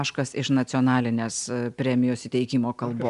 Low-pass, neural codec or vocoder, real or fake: 10.8 kHz; none; real